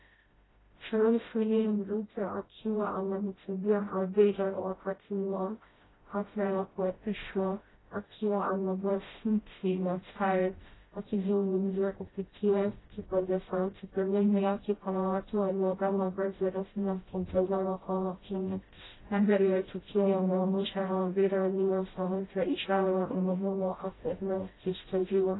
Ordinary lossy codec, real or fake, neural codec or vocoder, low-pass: AAC, 16 kbps; fake; codec, 16 kHz, 0.5 kbps, FreqCodec, smaller model; 7.2 kHz